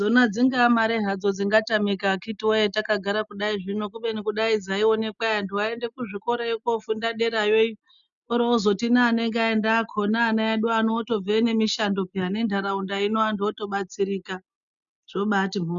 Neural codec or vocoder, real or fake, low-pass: none; real; 7.2 kHz